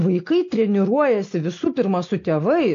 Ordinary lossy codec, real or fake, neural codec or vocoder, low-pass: AAC, 48 kbps; real; none; 7.2 kHz